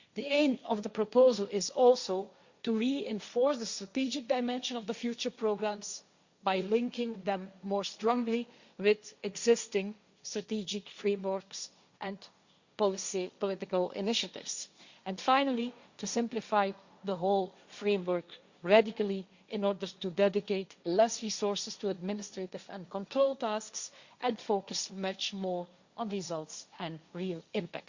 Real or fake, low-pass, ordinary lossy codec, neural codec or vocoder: fake; 7.2 kHz; Opus, 64 kbps; codec, 16 kHz, 1.1 kbps, Voila-Tokenizer